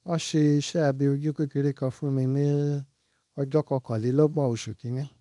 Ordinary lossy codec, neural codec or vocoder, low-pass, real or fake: none; codec, 24 kHz, 0.9 kbps, WavTokenizer, small release; 10.8 kHz; fake